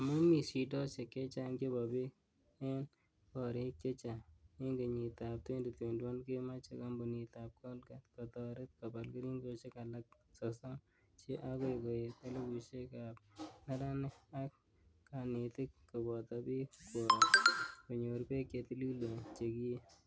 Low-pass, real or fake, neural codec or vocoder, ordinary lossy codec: none; real; none; none